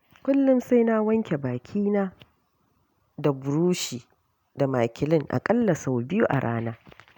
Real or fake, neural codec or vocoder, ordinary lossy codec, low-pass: real; none; none; 19.8 kHz